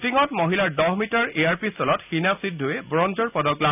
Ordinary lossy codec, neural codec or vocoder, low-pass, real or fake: none; none; 3.6 kHz; real